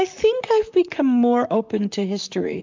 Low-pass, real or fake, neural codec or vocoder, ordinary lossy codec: 7.2 kHz; fake; vocoder, 44.1 kHz, 128 mel bands, Pupu-Vocoder; MP3, 64 kbps